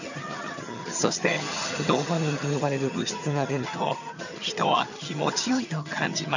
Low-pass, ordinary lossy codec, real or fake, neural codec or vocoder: 7.2 kHz; none; fake; vocoder, 22.05 kHz, 80 mel bands, HiFi-GAN